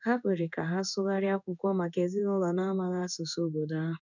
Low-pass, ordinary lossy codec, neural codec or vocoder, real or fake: 7.2 kHz; none; codec, 16 kHz in and 24 kHz out, 1 kbps, XY-Tokenizer; fake